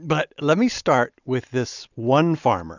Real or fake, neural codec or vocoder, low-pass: real; none; 7.2 kHz